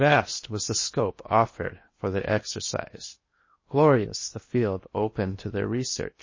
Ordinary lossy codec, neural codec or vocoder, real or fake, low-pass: MP3, 32 kbps; codec, 16 kHz in and 24 kHz out, 0.8 kbps, FocalCodec, streaming, 65536 codes; fake; 7.2 kHz